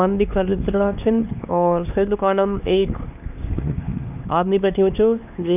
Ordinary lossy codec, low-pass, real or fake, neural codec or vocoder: none; 3.6 kHz; fake; codec, 16 kHz, 2 kbps, X-Codec, HuBERT features, trained on LibriSpeech